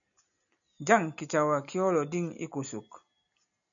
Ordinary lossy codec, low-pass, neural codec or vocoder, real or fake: MP3, 96 kbps; 7.2 kHz; none; real